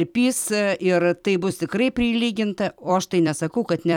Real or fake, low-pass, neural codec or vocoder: fake; 19.8 kHz; vocoder, 44.1 kHz, 128 mel bands every 256 samples, BigVGAN v2